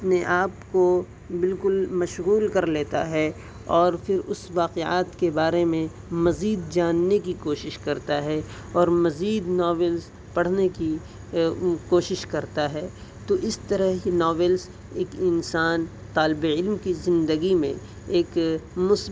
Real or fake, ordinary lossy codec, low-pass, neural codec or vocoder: real; none; none; none